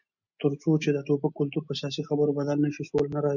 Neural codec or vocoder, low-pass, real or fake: none; 7.2 kHz; real